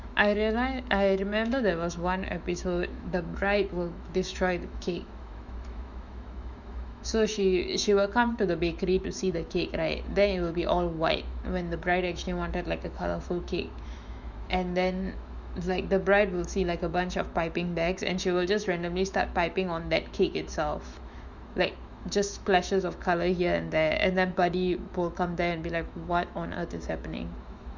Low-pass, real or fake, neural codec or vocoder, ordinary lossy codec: 7.2 kHz; fake; autoencoder, 48 kHz, 128 numbers a frame, DAC-VAE, trained on Japanese speech; none